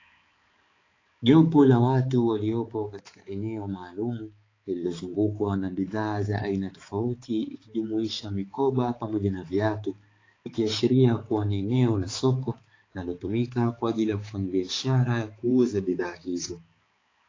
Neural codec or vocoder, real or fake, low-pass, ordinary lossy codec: codec, 16 kHz, 4 kbps, X-Codec, HuBERT features, trained on balanced general audio; fake; 7.2 kHz; AAC, 32 kbps